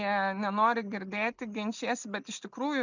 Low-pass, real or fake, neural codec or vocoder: 7.2 kHz; real; none